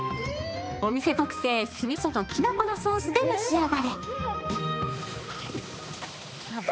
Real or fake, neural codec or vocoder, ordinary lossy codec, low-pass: fake; codec, 16 kHz, 2 kbps, X-Codec, HuBERT features, trained on balanced general audio; none; none